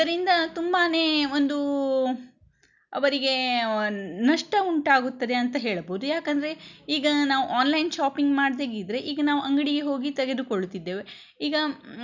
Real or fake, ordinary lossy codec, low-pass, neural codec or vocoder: real; none; 7.2 kHz; none